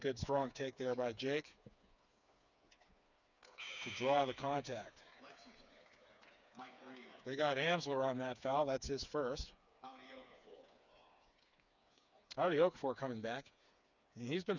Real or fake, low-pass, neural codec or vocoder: fake; 7.2 kHz; codec, 16 kHz, 4 kbps, FreqCodec, smaller model